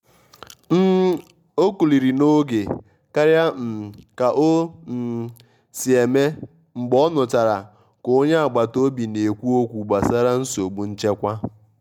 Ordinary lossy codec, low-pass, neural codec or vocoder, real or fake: MP3, 96 kbps; 19.8 kHz; none; real